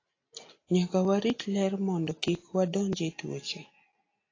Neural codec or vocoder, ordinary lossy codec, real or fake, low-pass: none; AAC, 32 kbps; real; 7.2 kHz